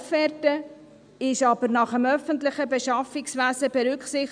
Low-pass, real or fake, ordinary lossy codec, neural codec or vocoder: 9.9 kHz; real; none; none